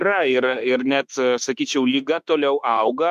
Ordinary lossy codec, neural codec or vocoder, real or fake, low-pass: MP3, 96 kbps; autoencoder, 48 kHz, 32 numbers a frame, DAC-VAE, trained on Japanese speech; fake; 14.4 kHz